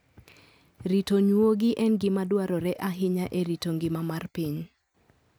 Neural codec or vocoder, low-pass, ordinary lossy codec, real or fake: none; none; none; real